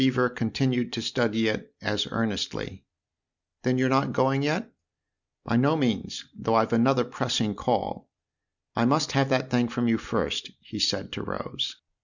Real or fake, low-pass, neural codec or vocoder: real; 7.2 kHz; none